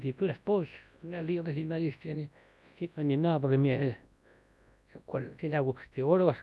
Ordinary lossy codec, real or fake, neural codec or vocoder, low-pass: none; fake; codec, 24 kHz, 0.9 kbps, WavTokenizer, large speech release; none